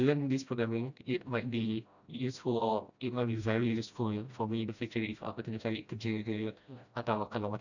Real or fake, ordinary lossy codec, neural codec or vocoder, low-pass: fake; none; codec, 16 kHz, 1 kbps, FreqCodec, smaller model; 7.2 kHz